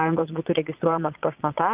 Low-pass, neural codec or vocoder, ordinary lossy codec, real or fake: 3.6 kHz; vocoder, 22.05 kHz, 80 mel bands, Vocos; Opus, 24 kbps; fake